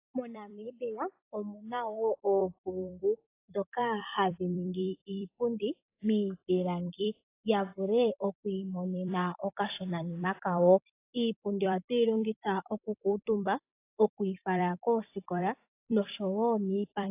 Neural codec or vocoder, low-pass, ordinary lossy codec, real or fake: vocoder, 44.1 kHz, 128 mel bands every 256 samples, BigVGAN v2; 3.6 kHz; AAC, 24 kbps; fake